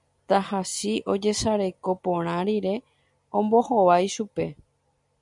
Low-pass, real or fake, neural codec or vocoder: 10.8 kHz; real; none